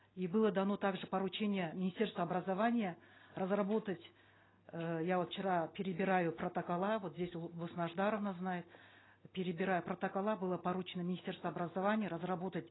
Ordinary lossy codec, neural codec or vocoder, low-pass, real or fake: AAC, 16 kbps; none; 7.2 kHz; real